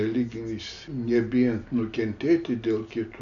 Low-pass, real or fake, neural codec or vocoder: 7.2 kHz; real; none